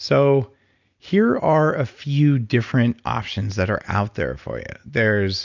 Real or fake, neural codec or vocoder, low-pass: real; none; 7.2 kHz